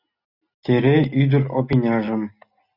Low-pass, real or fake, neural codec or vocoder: 5.4 kHz; real; none